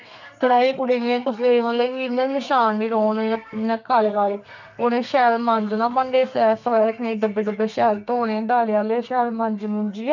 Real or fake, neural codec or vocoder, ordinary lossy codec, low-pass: fake; codec, 32 kHz, 1.9 kbps, SNAC; none; 7.2 kHz